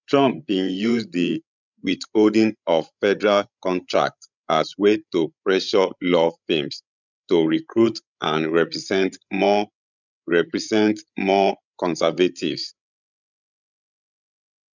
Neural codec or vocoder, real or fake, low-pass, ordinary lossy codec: codec, 16 kHz, 16 kbps, FreqCodec, larger model; fake; 7.2 kHz; none